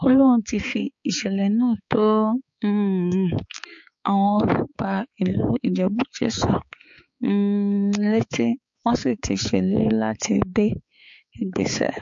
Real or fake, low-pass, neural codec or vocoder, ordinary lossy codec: fake; 7.2 kHz; codec, 16 kHz, 4 kbps, X-Codec, HuBERT features, trained on balanced general audio; MP3, 48 kbps